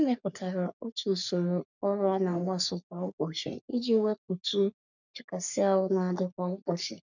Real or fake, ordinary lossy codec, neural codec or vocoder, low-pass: fake; none; codec, 44.1 kHz, 3.4 kbps, Pupu-Codec; 7.2 kHz